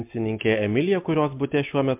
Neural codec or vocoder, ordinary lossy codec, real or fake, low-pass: none; MP3, 32 kbps; real; 3.6 kHz